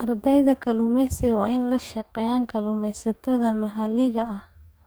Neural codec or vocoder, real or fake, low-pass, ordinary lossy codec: codec, 44.1 kHz, 2.6 kbps, DAC; fake; none; none